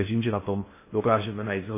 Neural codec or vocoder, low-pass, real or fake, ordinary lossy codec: codec, 16 kHz in and 24 kHz out, 0.6 kbps, FocalCodec, streaming, 2048 codes; 3.6 kHz; fake; MP3, 16 kbps